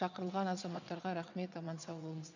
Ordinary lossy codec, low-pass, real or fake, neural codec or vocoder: none; 7.2 kHz; fake; vocoder, 22.05 kHz, 80 mel bands, WaveNeXt